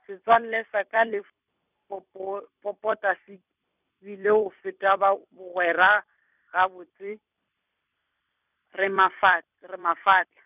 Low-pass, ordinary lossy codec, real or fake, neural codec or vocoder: 3.6 kHz; none; fake; vocoder, 44.1 kHz, 128 mel bands every 256 samples, BigVGAN v2